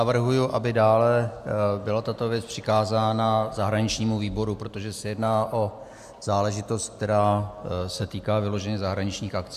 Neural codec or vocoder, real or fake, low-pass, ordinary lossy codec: none; real; 14.4 kHz; AAC, 96 kbps